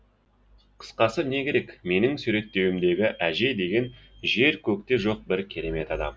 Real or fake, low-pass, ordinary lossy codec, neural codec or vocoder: real; none; none; none